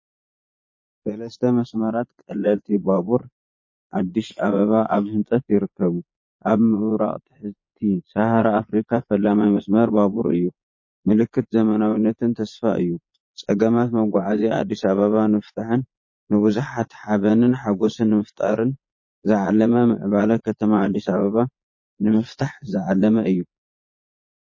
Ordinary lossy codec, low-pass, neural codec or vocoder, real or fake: MP3, 32 kbps; 7.2 kHz; vocoder, 22.05 kHz, 80 mel bands, Vocos; fake